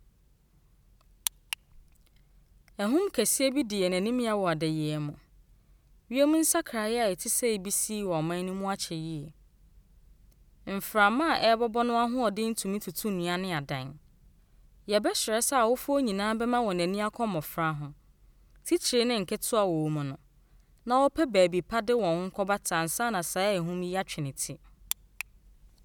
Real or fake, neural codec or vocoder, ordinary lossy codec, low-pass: real; none; none; none